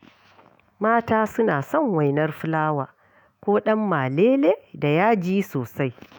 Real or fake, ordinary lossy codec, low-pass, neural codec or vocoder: fake; none; none; autoencoder, 48 kHz, 128 numbers a frame, DAC-VAE, trained on Japanese speech